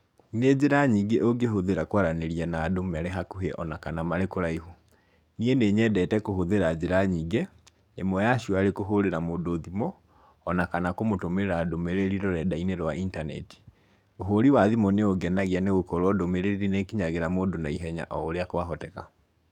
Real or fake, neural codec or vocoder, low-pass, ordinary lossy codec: fake; codec, 44.1 kHz, 7.8 kbps, DAC; 19.8 kHz; none